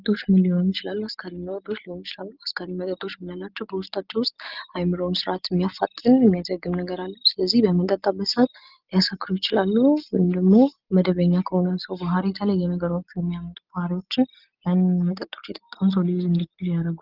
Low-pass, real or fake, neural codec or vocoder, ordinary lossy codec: 5.4 kHz; real; none; Opus, 32 kbps